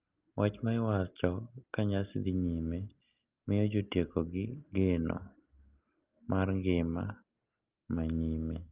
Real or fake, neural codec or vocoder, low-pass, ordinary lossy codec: real; none; 3.6 kHz; Opus, 32 kbps